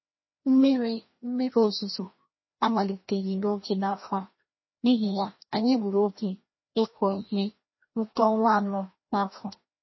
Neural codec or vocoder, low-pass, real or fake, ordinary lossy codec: codec, 16 kHz, 1 kbps, FreqCodec, larger model; 7.2 kHz; fake; MP3, 24 kbps